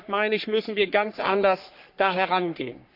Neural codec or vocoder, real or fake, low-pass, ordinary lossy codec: codec, 44.1 kHz, 3.4 kbps, Pupu-Codec; fake; 5.4 kHz; none